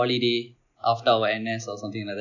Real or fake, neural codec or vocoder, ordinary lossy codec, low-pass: real; none; none; 7.2 kHz